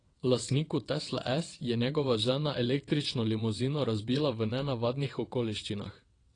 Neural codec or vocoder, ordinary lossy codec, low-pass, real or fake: vocoder, 22.05 kHz, 80 mel bands, WaveNeXt; AAC, 32 kbps; 9.9 kHz; fake